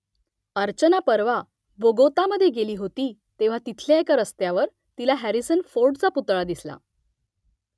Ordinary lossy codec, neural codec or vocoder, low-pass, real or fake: none; none; none; real